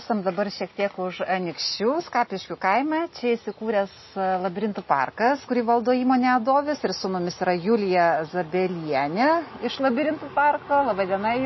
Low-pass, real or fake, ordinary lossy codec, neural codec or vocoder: 7.2 kHz; real; MP3, 24 kbps; none